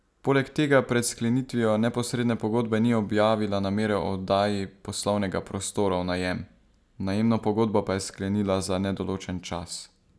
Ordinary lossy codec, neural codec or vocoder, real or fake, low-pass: none; none; real; none